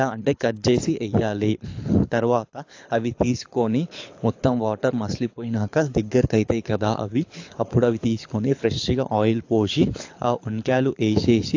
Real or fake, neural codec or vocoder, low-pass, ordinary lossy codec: fake; codec, 24 kHz, 6 kbps, HILCodec; 7.2 kHz; AAC, 48 kbps